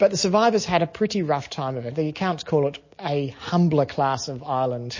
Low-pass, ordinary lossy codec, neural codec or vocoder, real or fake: 7.2 kHz; MP3, 32 kbps; none; real